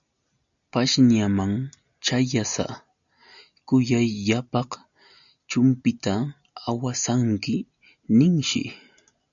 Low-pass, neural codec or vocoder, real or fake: 7.2 kHz; none; real